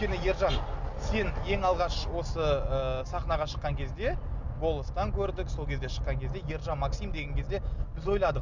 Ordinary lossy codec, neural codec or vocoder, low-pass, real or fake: none; none; 7.2 kHz; real